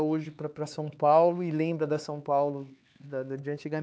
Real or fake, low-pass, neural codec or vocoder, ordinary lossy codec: fake; none; codec, 16 kHz, 4 kbps, X-Codec, HuBERT features, trained on LibriSpeech; none